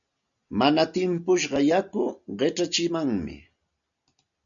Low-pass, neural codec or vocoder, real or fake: 7.2 kHz; none; real